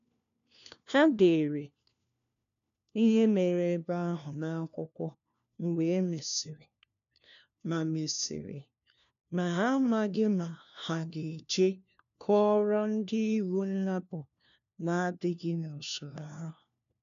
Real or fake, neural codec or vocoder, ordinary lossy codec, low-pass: fake; codec, 16 kHz, 1 kbps, FunCodec, trained on LibriTTS, 50 frames a second; none; 7.2 kHz